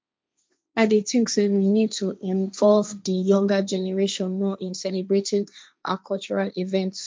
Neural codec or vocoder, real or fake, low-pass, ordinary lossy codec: codec, 16 kHz, 1.1 kbps, Voila-Tokenizer; fake; none; none